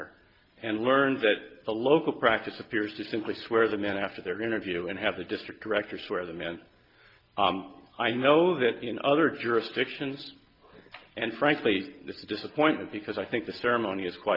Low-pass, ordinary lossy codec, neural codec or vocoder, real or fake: 5.4 kHz; Opus, 24 kbps; none; real